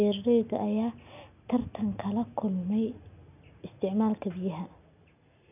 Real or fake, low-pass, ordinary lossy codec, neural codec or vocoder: real; 3.6 kHz; none; none